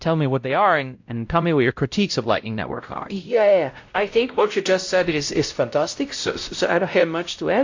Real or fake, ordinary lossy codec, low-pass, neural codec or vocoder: fake; AAC, 48 kbps; 7.2 kHz; codec, 16 kHz, 0.5 kbps, X-Codec, WavLM features, trained on Multilingual LibriSpeech